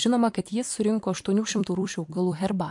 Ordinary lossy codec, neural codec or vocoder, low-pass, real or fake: MP3, 64 kbps; vocoder, 44.1 kHz, 128 mel bands every 256 samples, BigVGAN v2; 10.8 kHz; fake